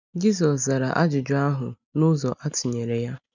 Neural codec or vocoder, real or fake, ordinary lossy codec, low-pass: none; real; none; 7.2 kHz